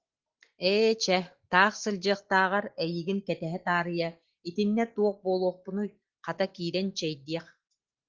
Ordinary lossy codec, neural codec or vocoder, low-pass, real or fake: Opus, 16 kbps; none; 7.2 kHz; real